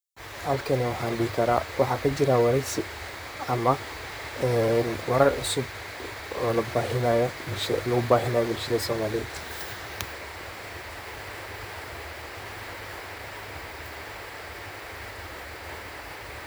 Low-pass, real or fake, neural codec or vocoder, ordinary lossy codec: none; fake; vocoder, 44.1 kHz, 128 mel bands, Pupu-Vocoder; none